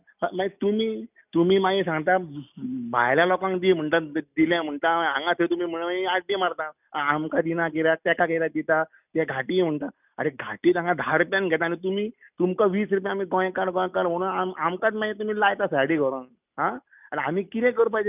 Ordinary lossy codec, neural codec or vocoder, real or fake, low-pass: none; none; real; 3.6 kHz